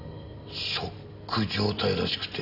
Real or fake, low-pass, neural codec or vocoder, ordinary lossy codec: real; 5.4 kHz; none; none